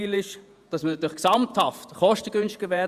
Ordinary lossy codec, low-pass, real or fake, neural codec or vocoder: Opus, 64 kbps; 14.4 kHz; fake; vocoder, 48 kHz, 128 mel bands, Vocos